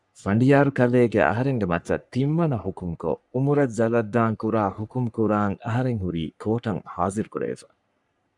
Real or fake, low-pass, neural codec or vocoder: fake; 10.8 kHz; codec, 44.1 kHz, 3.4 kbps, Pupu-Codec